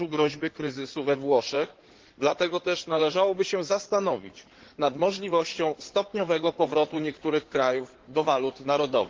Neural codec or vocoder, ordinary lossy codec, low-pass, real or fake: codec, 16 kHz in and 24 kHz out, 2.2 kbps, FireRedTTS-2 codec; Opus, 16 kbps; 7.2 kHz; fake